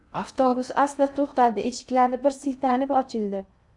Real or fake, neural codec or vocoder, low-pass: fake; codec, 16 kHz in and 24 kHz out, 0.6 kbps, FocalCodec, streaming, 2048 codes; 10.8 kHz